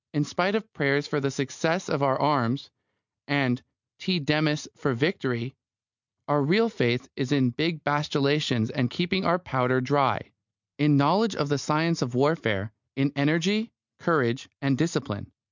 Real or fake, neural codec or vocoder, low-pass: real; none; 7.2 kHz